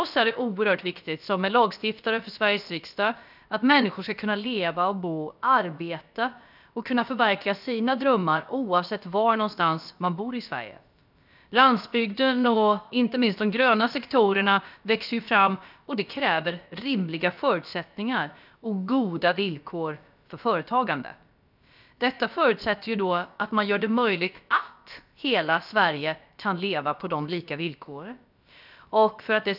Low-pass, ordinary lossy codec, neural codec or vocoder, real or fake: 5.4 kHz; none; codec, 16 kHz, about 1 kbps, DyCAST, with the encoder's durations; fake